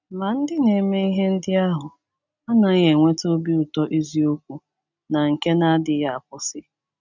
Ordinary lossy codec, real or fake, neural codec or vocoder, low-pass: none; real; none; 7.2 kHz